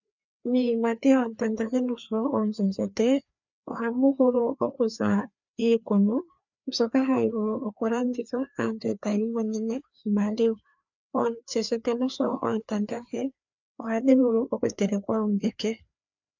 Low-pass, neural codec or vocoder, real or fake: 7.2 kHz; codec, 16 kHz, 2 kbps, FreqCodec, larger model; fake